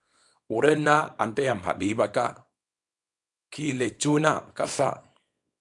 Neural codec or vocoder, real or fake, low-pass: codec, 24 kHz, 0.9 kbps, WavTokenizer, small release; fake; 10.8 kHz